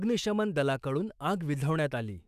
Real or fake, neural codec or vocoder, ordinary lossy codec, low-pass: fake; autoencoder, 48 kHz, 128 numbers a frame, DAC-VAE, trained on Japanese speech; none; 14.4 kHz